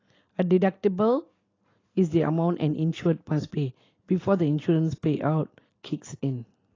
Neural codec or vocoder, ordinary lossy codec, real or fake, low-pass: none; AAC, 32 kbps; real; 7.2 kHz